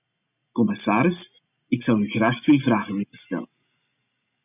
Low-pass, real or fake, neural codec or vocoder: 3.6 kHz; real; none